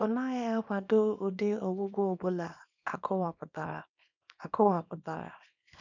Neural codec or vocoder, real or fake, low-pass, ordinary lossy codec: codec, 24 kHz, 0.9 kbps, WavTokenizer, small release; fake; 7.2 kHz; none